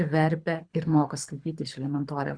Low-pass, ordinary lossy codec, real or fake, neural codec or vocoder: 9.9 kHz; Opus, 64 kbps; fake; codec, 24 kHz, 6 kbps, HILCodec